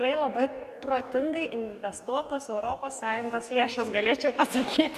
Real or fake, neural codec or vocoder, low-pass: fake; codec, 44.1 kHz, 2.6 kbps, DAC; 14.4 kHz